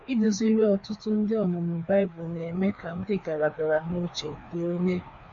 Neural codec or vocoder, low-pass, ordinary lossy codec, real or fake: codec, 16 kHz, 2 kbps, FreqCodec, larger model; 7.2 kHz; MP3, 48 kbps; fake